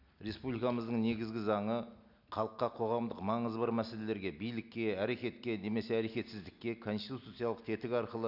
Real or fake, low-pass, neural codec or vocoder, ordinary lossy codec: real; 5.4 kHz; none; none